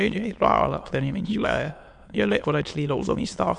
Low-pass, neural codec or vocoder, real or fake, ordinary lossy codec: 9.9 kHz; autoencoder, 22.05 kHz, a latent of 192 numbers a frame, VITS, trained on many speakers; fake; MP3, 64 kbps